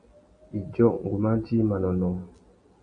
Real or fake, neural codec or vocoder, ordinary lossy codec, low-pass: real; none; MP3, 48 kbps; 9.9 kHz